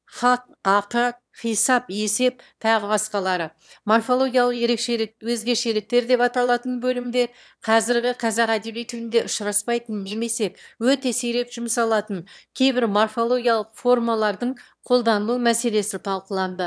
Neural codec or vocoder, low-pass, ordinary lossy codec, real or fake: autoencoder, 22.05 kHz, a latent of 192 numbers a frame, VITS, trained on one speaker; none; none; fake